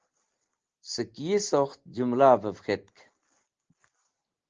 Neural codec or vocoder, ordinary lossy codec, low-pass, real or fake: none; Opus, 16 kbps; 7.2 kHz; real